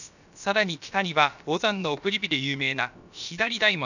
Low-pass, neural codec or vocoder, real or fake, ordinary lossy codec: 7.2 kHz; codec, 16 kHz, about 1 kbps, DyCAST, with the encoder's durations; fake; none